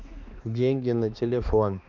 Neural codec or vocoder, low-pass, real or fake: codec, 16 kHz, 2 kbps, X-Codec, HuBERT features, trained on balanced general audio; 7.2 kHz; fake